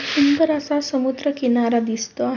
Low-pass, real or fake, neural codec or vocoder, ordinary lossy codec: 7.2 kHz; real; none; none